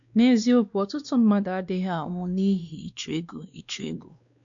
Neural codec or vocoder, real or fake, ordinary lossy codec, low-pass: codec, 16 kHz, 2 kbps, X-Codec, WavLM features, trained on Multilingual LibriSpeech; fake; MP3, 64 kbps; 7.2 kHz